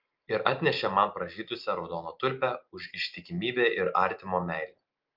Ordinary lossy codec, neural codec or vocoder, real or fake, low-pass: Opus, 24 kbps; none; real; 5.4 kHz